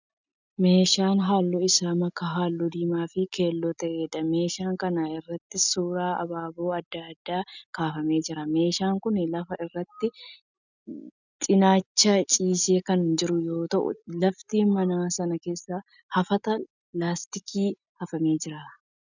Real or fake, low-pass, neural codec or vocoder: real; 7.2 kHz; none